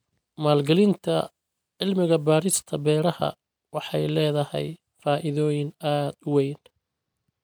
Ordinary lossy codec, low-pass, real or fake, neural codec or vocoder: none; none; real; none